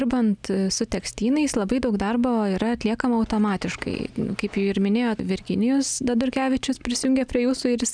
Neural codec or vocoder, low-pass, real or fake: none; 9.9 kHz; real